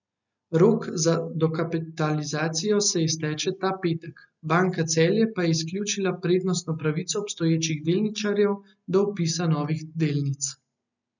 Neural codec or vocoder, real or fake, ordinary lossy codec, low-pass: none; real; none; 7.2 kHz